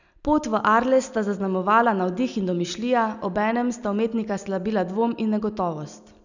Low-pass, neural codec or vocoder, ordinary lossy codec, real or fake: 7.2 kHz; none; none; real